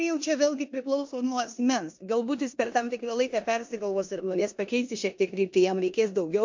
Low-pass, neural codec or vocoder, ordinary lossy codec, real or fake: 7.2 kHz; codec, 16 kHz in and 24 kHz out, 0.9 kbps, LongCat-Audio-Codec, four codebook decoder; MP3, 48 kbps; fake